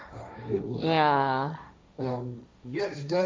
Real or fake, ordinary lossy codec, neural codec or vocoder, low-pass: fake; none; codec, 16 kHz, 1.1 kbps, Voila-Tokenizer; none